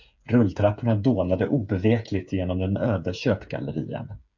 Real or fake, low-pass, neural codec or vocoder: fake; 7.2 kHz; codec, 16 kHz, 8 kbps, FreqCodec, smaller model